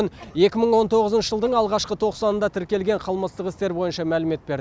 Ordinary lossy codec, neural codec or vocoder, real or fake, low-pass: none; none; real; none